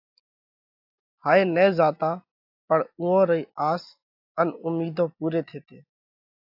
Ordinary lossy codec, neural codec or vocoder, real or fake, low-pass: AAC, 48 kbps; none; real; 5.4 kHz